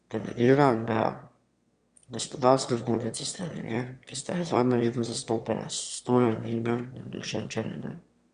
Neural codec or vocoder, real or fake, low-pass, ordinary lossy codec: autoencoder, 22.05 kHz, a latent of 192 numbers a frame, VITS, trained on one speaker; fake; 9.9 kHz; Opus, 64 kbps